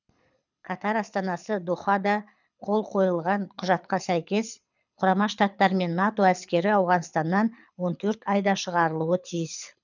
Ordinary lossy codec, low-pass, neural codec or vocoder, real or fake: none; 7.2 kHz; codec, 24 kHz, 6 kbps, HILCodec; fake